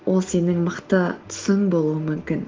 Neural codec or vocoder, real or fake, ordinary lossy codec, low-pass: none; real; Opus, 16 kbps; 7.2 kHz